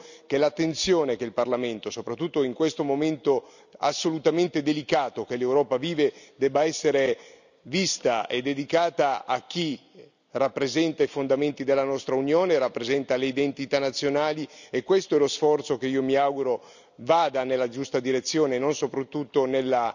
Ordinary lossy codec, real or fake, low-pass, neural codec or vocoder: none; real; 7.2 kHz; none